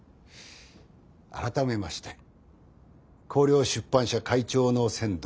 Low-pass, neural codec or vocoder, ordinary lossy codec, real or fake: none; none; none; real